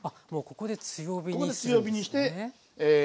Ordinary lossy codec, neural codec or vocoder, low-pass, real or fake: none; none; none; real